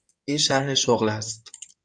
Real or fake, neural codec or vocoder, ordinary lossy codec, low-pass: fake; codec, 16 kHz in and 24 kHz out, 2.2 kbps, FireRedTTS-2 codec; AAC, 64 kbps; 9.9 kHz